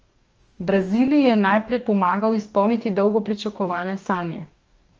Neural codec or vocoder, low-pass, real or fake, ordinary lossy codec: codec, 44.1 kHz, 2.6 kbps, DAC; 7.2 kHz; fake; Opus, 24 kbps